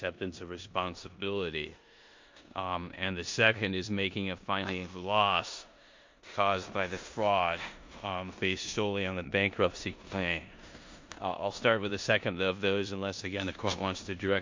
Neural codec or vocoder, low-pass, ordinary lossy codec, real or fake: codec, 16 kHz in and 24 kHz out, 0.9 kbps, LongCat-Audio-Codec, four codebook decoder; 7.2 kHz; MP3, 48 kbps; fake